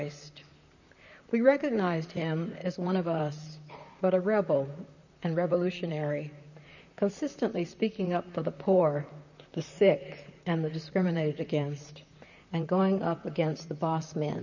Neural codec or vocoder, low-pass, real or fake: vocoder, 44.1 kHz, 128 mel bands, Pupu-Vocoder; 7.2 kHz; fake